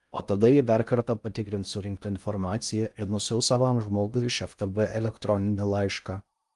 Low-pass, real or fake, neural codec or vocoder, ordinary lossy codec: 10.8 kHz; fake; codec, 16 kHz in and 24 kHz out, 0.6 kbps, FocalCodec, streaming, 4096 codes; Opus, 32 kbps